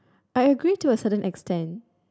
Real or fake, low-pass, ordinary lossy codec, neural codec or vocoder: real; none; none; none